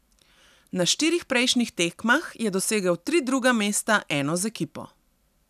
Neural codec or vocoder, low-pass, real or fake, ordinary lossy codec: none; 14.4 kHz; real; none